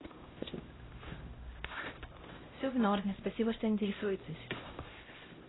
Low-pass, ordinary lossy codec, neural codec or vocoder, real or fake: 7.2 kHz; AAC, 16 kbps; codec, 16 kHz, 0.5 kbps, X-Codec, HuBERT features, trained on LibriSpeech; fake